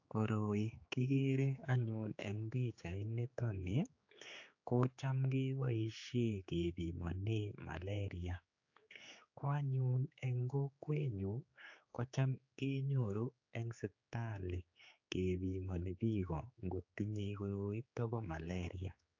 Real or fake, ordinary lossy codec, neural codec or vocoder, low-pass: fake; AAC, 48 kbps; codec, 16 kHz, 4 kbps, X-Codec, HuBERT features, trained on general audio; 7.2 kHz